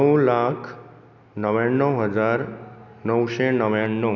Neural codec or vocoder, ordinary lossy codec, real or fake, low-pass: none; none; real; 7.2 kHz